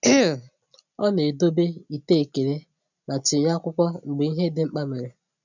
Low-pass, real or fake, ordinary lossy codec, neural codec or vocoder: 7.2 kHz; real; none; none